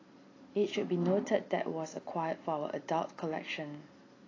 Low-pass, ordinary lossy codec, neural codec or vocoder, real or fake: 7.2 kHz; AAC, 32 kbps; none; real